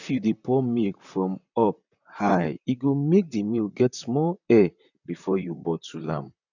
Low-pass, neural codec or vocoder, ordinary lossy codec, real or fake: 7.2 kHz; vocoder, 44.1 kHz, 128 mel bands, Pupu-Vocoder; none; fake